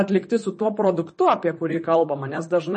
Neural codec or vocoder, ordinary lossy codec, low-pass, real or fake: vocoder, 44.1 kHz, 128 mel bands, Pupu-Vocoder; MP3, 32 kbps; 10.8 kHz; fake